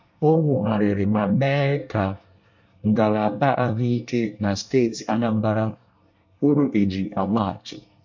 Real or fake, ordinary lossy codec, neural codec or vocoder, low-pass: fake; MP3, 64 kbps; codec, 24 kHz, 1 kbps, SNAC; 7.2 kHz